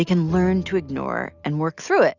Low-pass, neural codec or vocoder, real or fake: 7.2 kHz; none; real